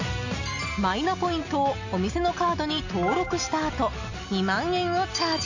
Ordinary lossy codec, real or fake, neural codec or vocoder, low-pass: AAC, 48 kbps; real; none; 7.2 kHz